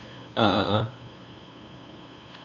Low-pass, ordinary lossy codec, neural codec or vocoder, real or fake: 7.2 kHz; none; codec, 16 kHz, 2 kbps, FunCodec, trained on LibriTTS, 25 frames a second; fake